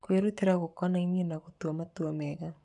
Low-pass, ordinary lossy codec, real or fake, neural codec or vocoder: 10.8 kHz; none; fake; codec, 44.1 kHz, 7.8 kbps, Pupu-Codec